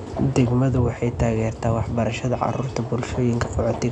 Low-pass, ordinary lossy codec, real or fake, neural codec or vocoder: 10.8 kHz; none; real; none